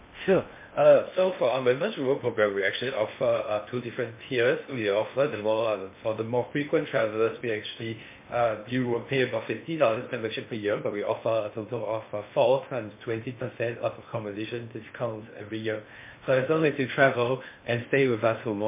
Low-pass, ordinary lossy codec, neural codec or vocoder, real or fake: 3.6 kHz; MP3, 24 kbps; codec, 16 kHz in and 24 kHz out, 0.6 kbps, FocalCodec, streaming, 4096 codes; fake